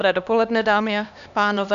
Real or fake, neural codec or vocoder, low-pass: fake; codec, 16 kHz, 2 kbps, X-Codec, HuBERT features, trained on LibriSpeech; 7.2 kHz